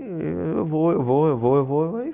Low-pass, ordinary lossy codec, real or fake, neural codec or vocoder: 3.6 kHz; Opus, 64 kbps; real; none